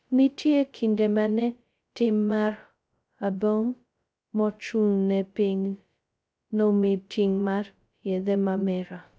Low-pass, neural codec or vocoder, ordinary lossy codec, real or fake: none; codec, 16 kHz, 0.2 kbps, FocalCodec; none; fake